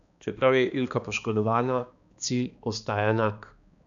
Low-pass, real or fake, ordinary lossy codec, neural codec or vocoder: 7.2 kHz; fake; none; codec, 16 kHz, 2 kbps, X-Codec, HuBERT features, trained on balanced general audio